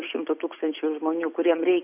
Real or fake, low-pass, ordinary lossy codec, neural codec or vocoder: real; 3.6 kHz; AAC, 32 kbps; none